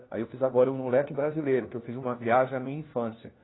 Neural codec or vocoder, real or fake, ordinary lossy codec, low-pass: codec, 16 kHz, 1 kbps, FunCodec, trained on LibriTTS, 50 frames a second; fake; AAC, 16 kbps; 7.2 kHz